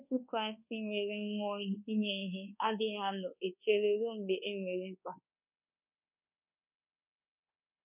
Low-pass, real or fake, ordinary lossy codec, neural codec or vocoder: 3.6 kHz; fake; none; codec, 24 kHz, 1.2 kbps, DualCodec